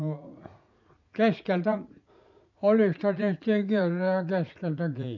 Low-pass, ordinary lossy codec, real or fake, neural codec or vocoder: 7.2 kHz; none; fake; vocoder, 44.1 kHz, 80 mel bands, Vocos